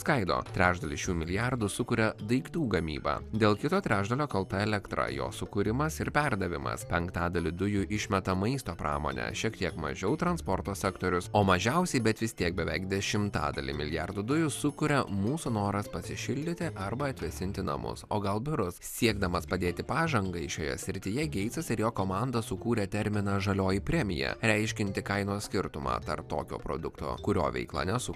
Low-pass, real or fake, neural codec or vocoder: 14.4 kHz; real; none